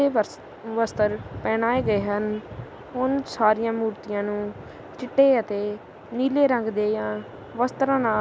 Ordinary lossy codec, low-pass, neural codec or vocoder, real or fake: none; none; none; real